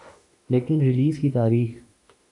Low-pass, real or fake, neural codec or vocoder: 10.8 kHz; fake; autoencoder, 48 kHz, 32 numbers a frame, DAC-VAE, trained on Japanese speech